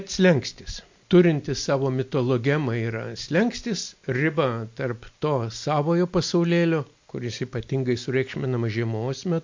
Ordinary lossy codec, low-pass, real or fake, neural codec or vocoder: MP3, 48 kbps; 7.2 kHz; real; none